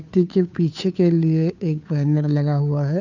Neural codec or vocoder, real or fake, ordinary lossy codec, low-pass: codec, 16 kHz, 2 kbps, FunCodec, trained on Chinese and English, 25 frames a second; fake; none; 7.2 kHz